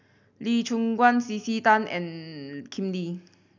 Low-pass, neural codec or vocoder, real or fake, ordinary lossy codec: 7.2 kHz; none; real; none